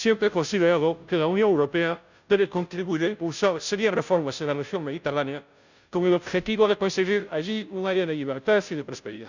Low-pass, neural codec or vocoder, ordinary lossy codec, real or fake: 7.2 kHz; codec, 16 kHz, 0.5 kbps, FunCodec, trained on Chinese and English, 25 frames a second; none; fake